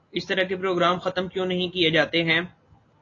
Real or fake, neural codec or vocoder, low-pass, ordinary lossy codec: real; none; 7.2 kHz; AAC, 32 kbps